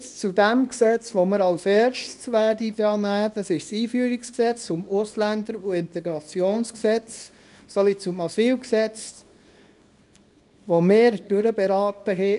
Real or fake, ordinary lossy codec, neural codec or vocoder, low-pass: fake; none; codec, 24 kHz, 0.9 kbps, WavTokenizer, small release; 10.8 kHz